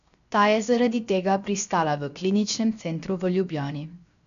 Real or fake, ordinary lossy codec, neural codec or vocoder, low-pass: fake; none; codec, 16 kHz, 0.7 kbps, FocalCodec; 7.2 kHz